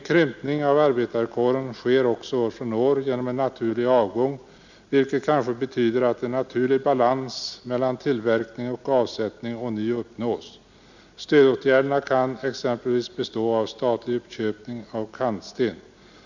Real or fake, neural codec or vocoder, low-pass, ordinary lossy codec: real; none; 7.2 kHz; none